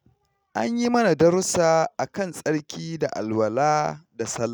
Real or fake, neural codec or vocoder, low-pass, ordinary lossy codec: real; none; none; none